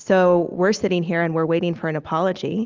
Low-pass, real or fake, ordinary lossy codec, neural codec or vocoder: 7.2 kHz; real; Opus, 32 kbps; none